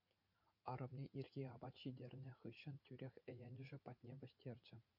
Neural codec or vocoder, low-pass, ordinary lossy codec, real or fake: vocoder, 44.1 kHz, 80 mel bands, Vocos; 5.4 kHz; MP3, 48 kbps; fake